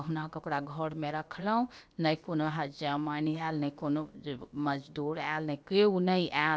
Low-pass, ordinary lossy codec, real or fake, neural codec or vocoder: none; none; fake; codec, 16 kHz, about 1 kbps, DyCAST, with the encoder's durations